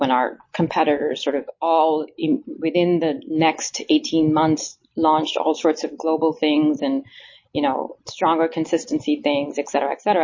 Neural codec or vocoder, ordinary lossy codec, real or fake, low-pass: none; MP3, 32 kbps; real; 7.2 kHz